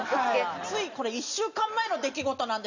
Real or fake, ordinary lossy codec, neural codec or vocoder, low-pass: real; none; none; 7.2 kHz